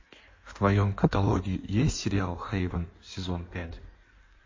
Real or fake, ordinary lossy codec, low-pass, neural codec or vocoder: fake; MP3, 32 kbps; 7.2 kHz; codec, 16 kHz in and 24 kHz out, 1.1 kbps, FireRedTTS-2 codec